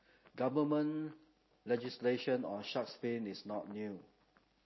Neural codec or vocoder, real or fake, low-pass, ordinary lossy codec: none; real; 7.2 kHz; MP3, 24 kbps